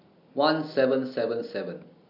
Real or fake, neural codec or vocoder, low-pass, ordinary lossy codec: real; none; 5.4 kHz; none